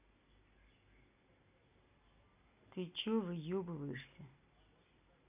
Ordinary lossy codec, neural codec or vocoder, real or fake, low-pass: none; none; real; 3.6 kHz